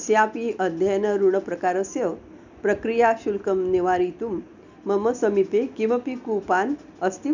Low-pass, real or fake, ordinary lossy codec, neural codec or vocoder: 7.2 kHz; real; none; none